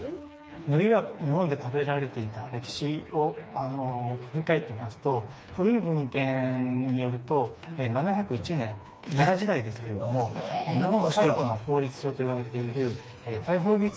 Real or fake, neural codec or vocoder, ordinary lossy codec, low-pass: fake; codec, 16 kHz, 2 kbps, FreqCodec, smaller model; none; none